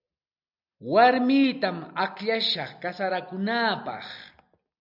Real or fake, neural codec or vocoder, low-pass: real; none; 5.4 kHz